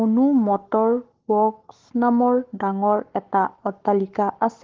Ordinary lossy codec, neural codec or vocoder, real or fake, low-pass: Opus, 16 kbps; codec, 44.1 kHz, 7.8 kbps, Pupu-Codec; fake; 7.2 kHz